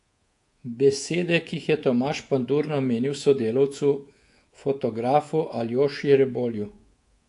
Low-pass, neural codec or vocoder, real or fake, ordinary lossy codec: 10.8 kHz; codec, 24 kHz, 3.1 kbps, DualCodec; fake; AAC, 48 kbps